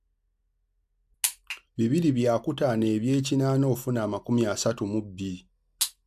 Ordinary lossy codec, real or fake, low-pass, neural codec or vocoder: AAC, 96 kbps; real; 14.4 kHz; none